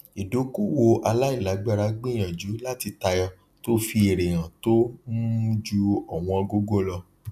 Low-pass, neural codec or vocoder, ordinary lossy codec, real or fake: 14.4 kHz; none; none; real